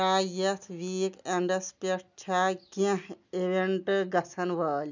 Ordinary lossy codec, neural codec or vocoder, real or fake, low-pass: none; none; real; 7.2 kHz